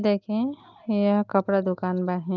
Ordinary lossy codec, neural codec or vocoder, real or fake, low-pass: Opus, 32 kbps; none; real; 7.2 kHz